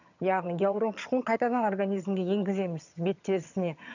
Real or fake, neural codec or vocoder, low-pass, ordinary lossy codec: fake; vocoder, 22.05 kHz, 80 mel bands, HiFi-GAN; 7.2 kHz; none